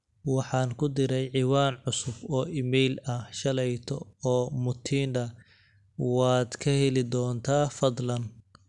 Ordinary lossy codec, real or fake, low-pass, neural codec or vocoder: none; real; 10.8 kHz; none